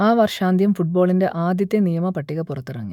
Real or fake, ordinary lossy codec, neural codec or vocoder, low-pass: fake; none; autoencoder, 48 kHz, 128 numbers a frame, DAC-VAE, trained on Japanese speech; 19.8 kHz